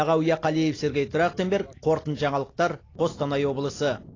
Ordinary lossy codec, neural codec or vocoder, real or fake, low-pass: AAC, 32 kbps; none; real; 7.2 kHz